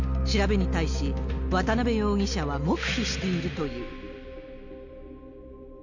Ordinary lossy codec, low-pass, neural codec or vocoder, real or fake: none; 7.2 kHz; none; real